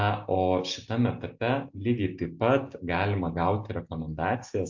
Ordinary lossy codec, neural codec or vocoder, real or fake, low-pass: MP3, 48 kbps; none; real; 7.2 kHz